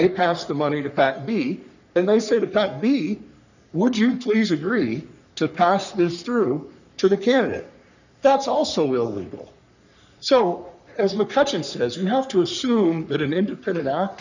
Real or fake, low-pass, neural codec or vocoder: fake; 7.2 kHz; codec, 44.1 kHz, 3.4 kbps, Pupu-Codec